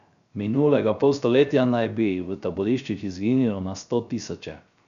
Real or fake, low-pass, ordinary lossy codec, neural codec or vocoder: fake; 7.2 kHz; none; codec, 16 kHz, 0.3 kbps, FocalCodec